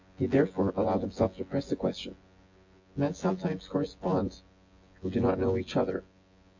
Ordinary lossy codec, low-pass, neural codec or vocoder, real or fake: AAC, 48 kbps; 7.2 kHz; vocoder, 24 kHz, 100 mel bands, Vocos; fake